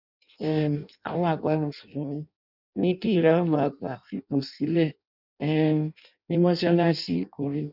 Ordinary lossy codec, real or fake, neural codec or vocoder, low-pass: none; fake; codec, 16 kHz in and 24 kHz out, 0.6 kbps, FireRedTTS-2 codec; 5.4 kHz